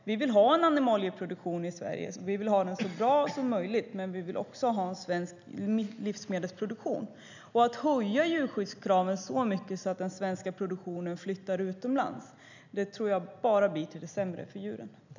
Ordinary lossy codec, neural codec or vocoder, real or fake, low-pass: none; none; real; 7.2 kHz